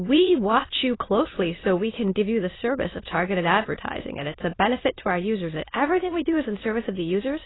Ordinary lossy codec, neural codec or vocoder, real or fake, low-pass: AAC, 16 kbps; autoencoder, 22.05 kHz, a latent of 192 numbers a frame, VITS, trained on many speakers; fake; 7.2 kHz